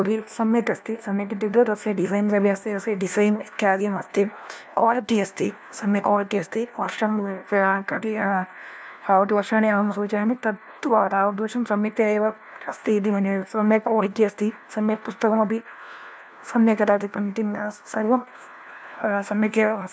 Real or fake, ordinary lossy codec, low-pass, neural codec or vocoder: fake; none; none; codec, 16 kHz, 1 kbps, FunCodec, trained on LibriTTS, 50 frames a second